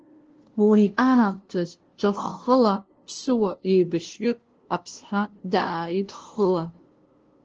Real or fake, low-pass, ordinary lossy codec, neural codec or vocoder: fake; 7.2 kHz; Opus, 16 kbps; codec, 16 kHz, 0.5 kbps, FunCodec, trained on LibriTTS, 25 frames a second